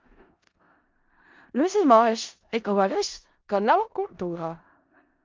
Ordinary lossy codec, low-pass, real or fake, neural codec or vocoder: Opus, 24 kbps; 7.2 kHz; fake; codec, 16 kHz in and 24 kHz out, 0.4 kbps, LongCat-Audio-Codec, four codebook decoder